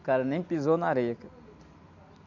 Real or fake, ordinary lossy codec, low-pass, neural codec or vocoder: real; none; 7.2 kHz; none